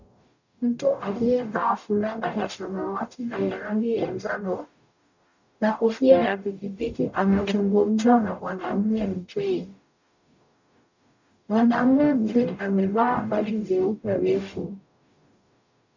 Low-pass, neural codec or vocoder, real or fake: 7.2 kHz; codec, 44.1 kHz, 0.9 kbps, DAC; fake